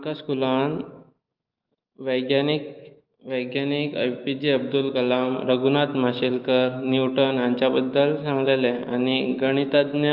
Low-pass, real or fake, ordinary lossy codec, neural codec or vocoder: 5.4 kHz; fake; Opus, 32 kbps; autoencoder, 48 kHz, 128 numbers a frame, DAC-VAE, trained on Japanese speech